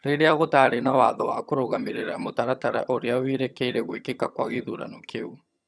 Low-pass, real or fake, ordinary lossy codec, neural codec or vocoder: none; fake; none; vocoder, 22.05 kHz, 80 mel bands, HiFi-GAN